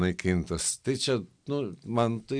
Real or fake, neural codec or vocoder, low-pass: real; none; 9.9 kHz